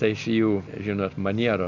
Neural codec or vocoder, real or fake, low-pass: none; real; 7.2 kHz